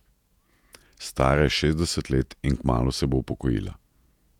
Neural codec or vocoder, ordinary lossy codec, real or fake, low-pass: vocoder, 48 kHz, 128 mel bands, Vocos; none; fake; 19.8 kHz